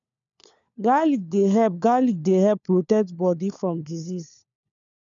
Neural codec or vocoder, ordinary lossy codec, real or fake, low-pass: codec, 16 kHz, 4 kbps, FunCodec, trained on LibriTTS, 50 frames a second; none; fake; 7.2 kHz